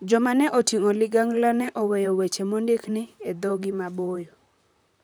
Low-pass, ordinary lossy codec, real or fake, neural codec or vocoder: none; none; fake; vocoder, 44.1 kHz, 128 mel bands, Pupu-Vocoder